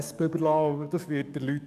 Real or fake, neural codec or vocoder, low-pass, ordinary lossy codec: fake; codec, 44.1 kHz, 7.8 kbps, DAC; 14.4 kHz; none